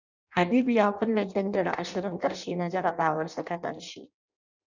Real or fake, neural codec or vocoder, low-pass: fake; codec, 16 kHz in and 24 kHz out, 0.6 kbps, FireRedTTS-2 codec; 7.2 kHz